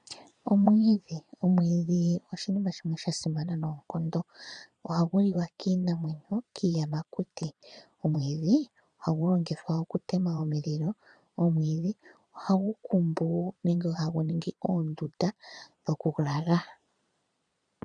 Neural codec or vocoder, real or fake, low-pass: vocoder, 22.05 kHz, 80 mel bands, Vocos; fake; 9.9 kHz